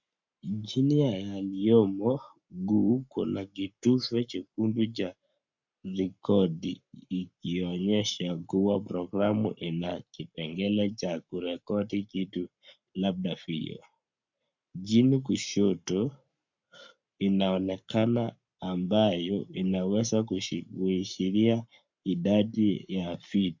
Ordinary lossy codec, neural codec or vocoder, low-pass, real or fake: MP3, 64 kbps; codec, 44.1 kHz, 7.8 kbps, Pupu-Codec; 7.2 kHz; fake